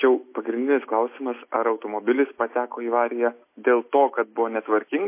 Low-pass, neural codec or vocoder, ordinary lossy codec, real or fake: 3.6 kHz; none; MP3, 24 kbps; real